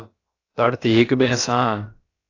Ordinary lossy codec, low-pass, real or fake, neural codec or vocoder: AAC, 32 kbps; 7.2 kHz; fake; codec, 16 kHz, about 1 kbps, DyCAST, with the encoder's durations